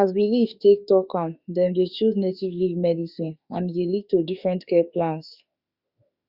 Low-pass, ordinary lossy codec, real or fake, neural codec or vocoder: 5.4 kHz; Opus, 64 kbps; fake; autoencoder, 48 kHz, 32 numbers a frame, DAC-VAE, trained on Japanese speech